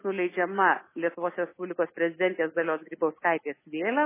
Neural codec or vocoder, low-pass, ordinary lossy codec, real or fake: none; 3.6 kHz; MP3, 16 kbps; real